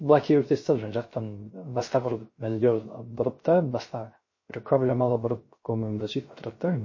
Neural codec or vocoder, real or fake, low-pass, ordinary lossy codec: codec, 16 kHz, 0.3 kbps, FocalCodec; fake; 7.2 kHz; MP3, 32 kbps